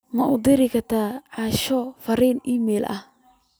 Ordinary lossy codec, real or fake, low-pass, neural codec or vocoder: none; real; none; none